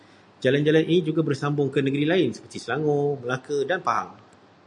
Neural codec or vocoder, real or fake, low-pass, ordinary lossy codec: none; real; 10.8 kHz; AAC, 64 kbps